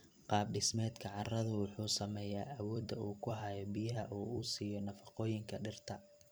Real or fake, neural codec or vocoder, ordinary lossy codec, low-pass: fake; vocoder, 44.1 kHz, 128 mel bands every 256 samples, BigVGAN v2; none; none